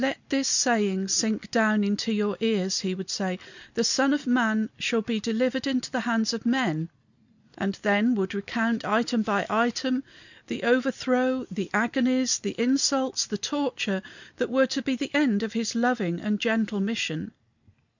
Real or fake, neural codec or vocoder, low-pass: real; none; 7.2 kHz